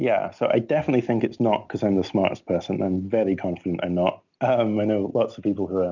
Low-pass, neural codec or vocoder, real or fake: 7.2 kHz; none; real